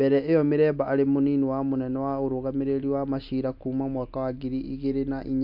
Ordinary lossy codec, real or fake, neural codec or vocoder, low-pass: MP3, 48 kbps; real; none; 5.4 kHz